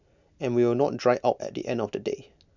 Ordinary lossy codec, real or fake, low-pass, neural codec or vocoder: none; real; 7.2 kHz; none